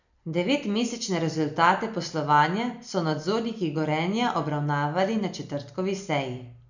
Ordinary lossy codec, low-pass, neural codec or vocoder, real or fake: none; 7.2 kHz; none; real